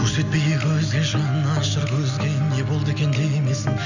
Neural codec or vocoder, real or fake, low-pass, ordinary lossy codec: none; real; 7.2 kHz; none